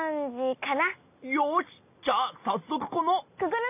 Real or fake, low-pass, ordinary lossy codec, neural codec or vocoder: real; 3.6 kHz; none; none